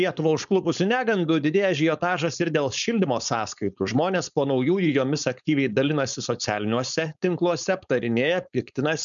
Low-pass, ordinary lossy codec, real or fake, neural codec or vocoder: 7.2 kHz; MP3, 96 kbps; fake; codec, 16 kHz, 4.8 kbps, FACodec